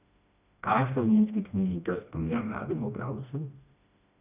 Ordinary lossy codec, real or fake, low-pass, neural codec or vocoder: none; fake; 3.6 kHz; codec, 16 kHz, 1 kbps, FreqCodec, smaller model